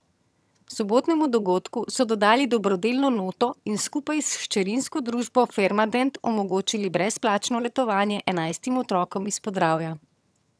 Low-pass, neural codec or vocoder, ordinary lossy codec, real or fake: none; vocoder, 22.05 kHz, 80 mel bands, HiFi-GAN; none; fake